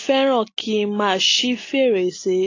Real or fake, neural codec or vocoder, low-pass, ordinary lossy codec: real; none; 7.2 kHz; AAC, 32 kbps